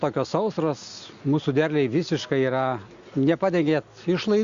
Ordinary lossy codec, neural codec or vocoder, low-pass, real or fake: Opus, 64 kbps; none; 7.2 kHz; real